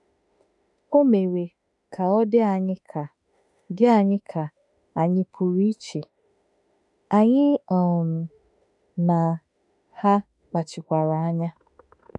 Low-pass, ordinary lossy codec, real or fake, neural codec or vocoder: 10.8 kHz; none; fake; autoencoder, 48 kHz, 32 numbers a frame, DAC-VAE, trained on Japanese speech